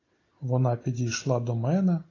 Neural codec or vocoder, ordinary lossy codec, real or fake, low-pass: none; AAC, 32 kbps; real; 7.2 kHz